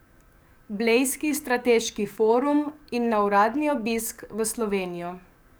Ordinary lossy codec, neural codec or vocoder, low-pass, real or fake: none; codec, 44.1 kHz, 7.8 kbps, DAC; none; fake